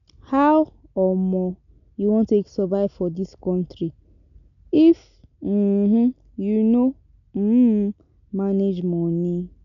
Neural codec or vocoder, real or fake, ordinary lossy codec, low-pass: none; real; none; 7.2 kHz